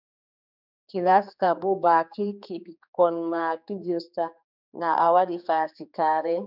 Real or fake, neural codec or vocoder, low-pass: fake; codec, 16 kHz, 4 kbps, X-Codec, HuBERT features, trained on general audio; 5.4 kHz